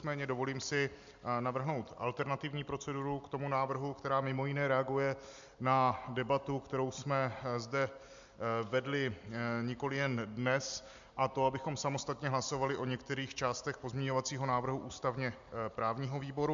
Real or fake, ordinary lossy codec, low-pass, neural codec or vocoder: real; MP3, 64 kbps; 7.2 kHz; none